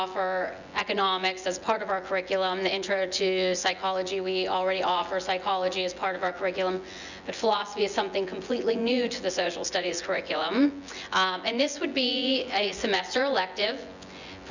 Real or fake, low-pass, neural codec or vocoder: fake; 7.2 kHz; vocoder, 24 kHz, 100 mel bands, Vocos